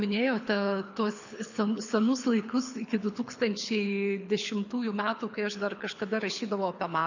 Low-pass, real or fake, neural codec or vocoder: 7.2 kHz; fake; codec, 24 kHz, 6 kbps, HILCodec